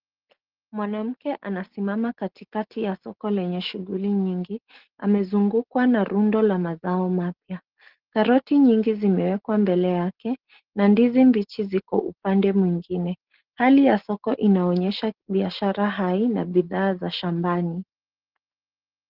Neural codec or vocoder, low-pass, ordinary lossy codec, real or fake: none; 5.4 kHz; Opus, 16 kbps; real